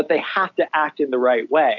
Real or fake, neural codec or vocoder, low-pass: real; none; 7.2 kHz